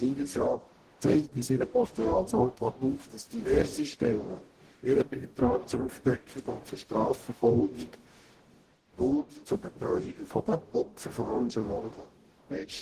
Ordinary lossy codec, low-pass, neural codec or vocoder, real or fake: Opus, 16 kbps; 14.4 kHz; codec, 44.1 kHz, 0.9 kbps, DAC; fake